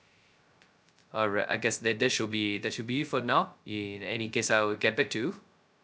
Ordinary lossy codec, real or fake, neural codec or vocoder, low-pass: none; fake; codec, 16 kHz, 0.2 kbps, FocalCodec; none